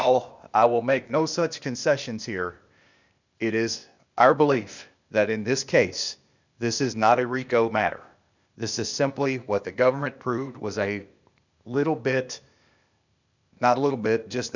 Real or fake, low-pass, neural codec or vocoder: fake; 7.2 kHz; codec, 16 kHz, 0.8 kbps, ZipCodec